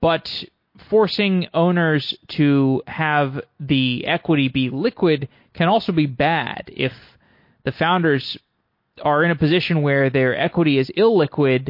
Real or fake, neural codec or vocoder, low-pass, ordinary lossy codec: real; none; 5.4 kHz; MP3, 32 kbps